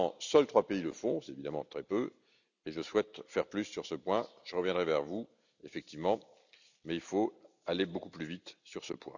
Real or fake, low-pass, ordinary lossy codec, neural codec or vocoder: real; 7.2 kHz; none; none